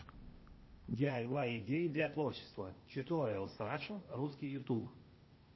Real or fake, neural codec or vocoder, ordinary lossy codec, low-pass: fake; codec, 16 kHz, 0.8 kbps, ZipCodec; MP3, 24 kbps; 7.2 kHz